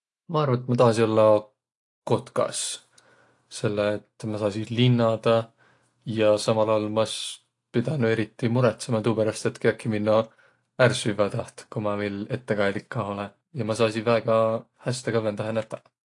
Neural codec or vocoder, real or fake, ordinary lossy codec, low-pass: none; real; AAC, 48 kbps; 10.8 kHz